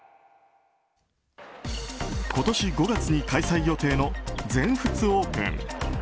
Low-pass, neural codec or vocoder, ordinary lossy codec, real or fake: none; none; none; real